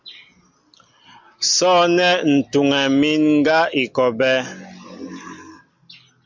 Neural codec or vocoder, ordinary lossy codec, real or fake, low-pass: none; MP3, 64 kbps; real; 7.2 kHz